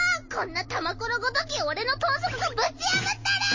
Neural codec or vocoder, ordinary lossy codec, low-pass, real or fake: none; MP3, 32 kbps; 7.2 kHz; real